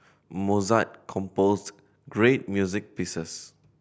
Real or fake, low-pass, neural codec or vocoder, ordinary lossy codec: real; none; none; none